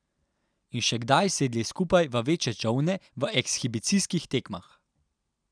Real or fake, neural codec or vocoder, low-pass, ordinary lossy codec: real; none; 9.9 kHz; none